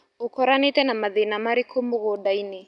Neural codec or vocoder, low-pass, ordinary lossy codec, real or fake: none; 10.8 kHz; none; real